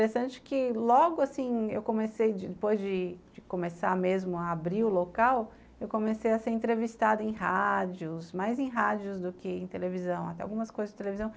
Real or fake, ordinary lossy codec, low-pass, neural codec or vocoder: real; none; none; none